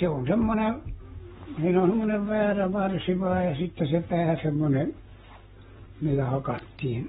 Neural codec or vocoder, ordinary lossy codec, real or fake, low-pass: codec, 44.1 kHz, 7.8 kbps, Pupu-Codec; AAC, 16 kbps; fake; 19.8 kHz